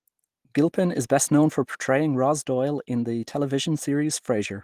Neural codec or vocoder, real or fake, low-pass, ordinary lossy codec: none; real; 14.4 kHz; Opus, 24 kbps